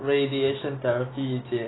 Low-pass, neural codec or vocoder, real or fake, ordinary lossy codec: 7.2 kHz; none; real; AAC, 16 kbps